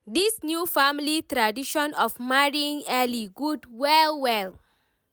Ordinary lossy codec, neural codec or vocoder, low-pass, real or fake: none; none; none; real